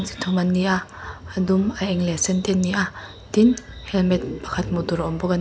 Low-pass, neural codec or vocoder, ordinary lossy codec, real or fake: none; none; none; real